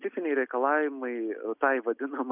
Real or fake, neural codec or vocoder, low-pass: real; none; 3.6 kHz